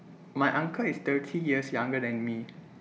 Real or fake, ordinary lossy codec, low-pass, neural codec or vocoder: real; none; none; none